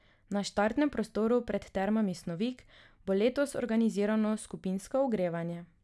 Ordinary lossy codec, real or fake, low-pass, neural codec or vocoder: none; real; none; none